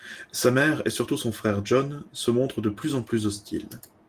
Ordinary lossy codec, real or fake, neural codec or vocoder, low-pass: Opus, 24 kbps; real; none; 14.4 kHz